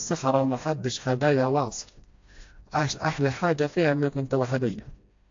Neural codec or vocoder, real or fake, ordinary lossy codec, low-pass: codec, 16 kHz, 1 kbps, FreqCodec, smaller model; fake; AAC, 48 kbps; 7.2 kHz